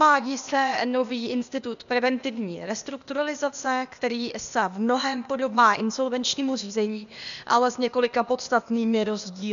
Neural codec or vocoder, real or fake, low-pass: codec, 16 kHz, 0.8 kbps, ZipCodec; fake; 7.2 kHz